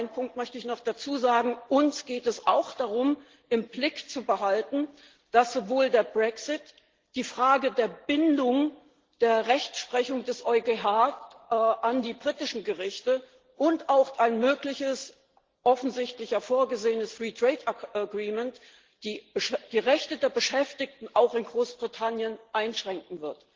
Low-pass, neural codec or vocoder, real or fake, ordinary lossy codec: 7.2 kHz; none; real; Opus, 16 kbps